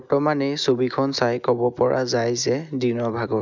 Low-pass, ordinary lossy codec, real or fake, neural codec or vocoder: 7.2 kHz; none; real; none